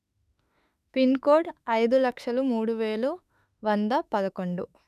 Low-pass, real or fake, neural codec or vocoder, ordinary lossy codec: 14.4 kHz; fake; autoencoder, 48 kHz, 32 numbers a frame, DAC-VAE, trained on Japanese speech; none